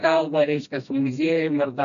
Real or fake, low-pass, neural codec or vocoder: fake; 7.2 kHz; codec, 16 kHz, 1 kbps, FreqCodec, smaller model